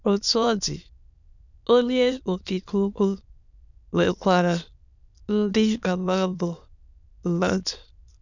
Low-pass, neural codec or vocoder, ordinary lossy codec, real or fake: 7.2 kHz; autoencoder, 22.05 kHz, a latent of 192 numbers a frame, VITS, trained on many speakers; none; fake